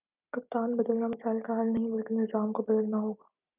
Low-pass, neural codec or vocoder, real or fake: 3.6 kHz; none; real